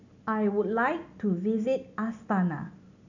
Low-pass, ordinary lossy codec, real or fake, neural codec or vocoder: 7.2 kHz; none; real; none